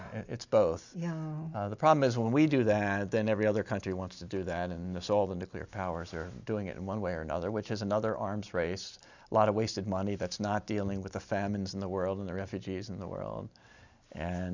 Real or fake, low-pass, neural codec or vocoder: real; 7.2 kHz; none